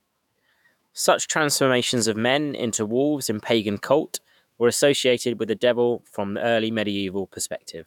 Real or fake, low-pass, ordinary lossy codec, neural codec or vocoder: fake; 19.8 kHz; none; autoencoder, 48 kHz, 128 numbers a frame, DAC-VAE, trained on Japanese speech